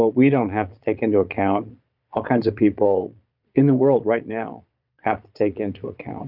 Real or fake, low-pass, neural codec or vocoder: fake; 5.4 kHz; vocoder, 22.05 kHz, 80 mel bands, Vocos